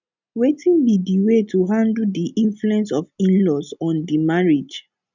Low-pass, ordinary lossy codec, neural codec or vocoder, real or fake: 7.2 kHz; none; none; real